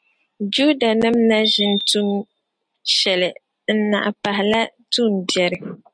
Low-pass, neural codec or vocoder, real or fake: 9.9 kHz; none; real